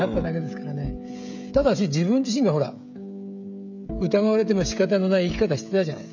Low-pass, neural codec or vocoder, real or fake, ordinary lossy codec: 7.2 kHz; codec, 16 kHz, 16 kbps, FreqCodec, smaller model; fake; none